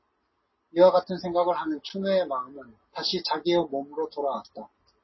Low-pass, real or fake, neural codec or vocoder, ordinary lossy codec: 7.2 kHz; real; none; MP3, 24 kbps